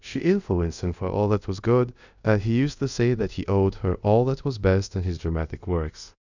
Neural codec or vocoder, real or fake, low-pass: codec, 24 kHz, 0.5 kbps, DualCodec; fake; 7.2 kHz